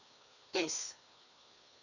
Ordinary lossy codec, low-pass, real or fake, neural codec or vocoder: none; 7.2 kHz; fake; codec, 16 kHz, 2 kbps, FreqCodec, larger model